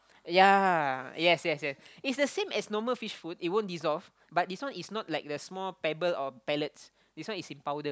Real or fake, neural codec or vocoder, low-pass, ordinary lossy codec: real; none; none; none